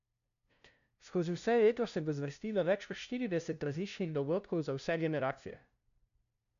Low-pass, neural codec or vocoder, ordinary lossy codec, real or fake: 7.2 kHz; codec, 16 kHz, 0.5 kbps, FunCodec, trained on LibriTTS, 25 frames a second; none; fake